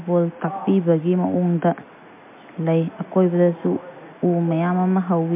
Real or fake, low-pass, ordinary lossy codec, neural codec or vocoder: real; 3.6 kHz; MP3, 32 kbps; none